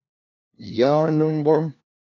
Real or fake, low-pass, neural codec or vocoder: fake; 7.2 kHz; codec, 16 kHz, 1 kbps, FunCodec, trained on LibriTTS, 50 frames a second